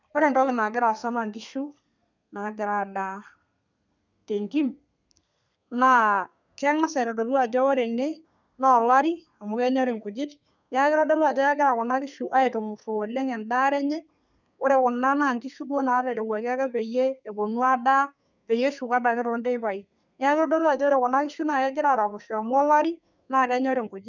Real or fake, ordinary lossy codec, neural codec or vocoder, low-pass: fake; none; codec, 32 kHz, 1.9 kbps, SNAC; 7.2 kHz